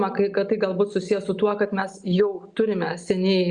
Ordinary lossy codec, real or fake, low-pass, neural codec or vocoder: Opus, 64 kbps; real; 9.9 kHz; none